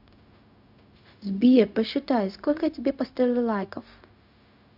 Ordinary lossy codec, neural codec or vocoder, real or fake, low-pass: AAC, 48 kbps; codec, 16 kHz, 0.4 kbps, LongCat-Audio-Codec; fake; 5.4 kHz